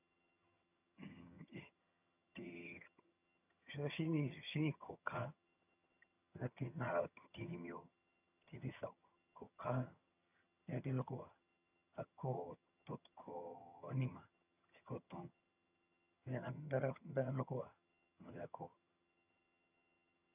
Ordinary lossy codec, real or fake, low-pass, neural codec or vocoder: none; fake; 3.6 kHz; vocoder, 22.05 kHz, 80 mel bands, HiFi-GAN